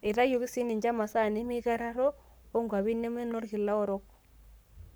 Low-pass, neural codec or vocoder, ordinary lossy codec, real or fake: none; codec, 44.1 kHz, 7.8 kbps, Pupu-Codec; none; fake